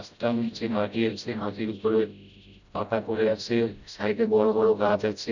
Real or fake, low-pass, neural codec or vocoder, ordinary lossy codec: fake; 7.2 kHz; codec, 16 kHz, 0.5 kbps, FreqCodec, smaller model; none